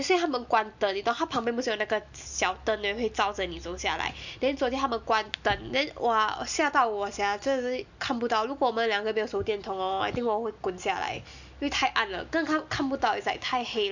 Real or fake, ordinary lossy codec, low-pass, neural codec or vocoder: real; none; 7.2 kHz; none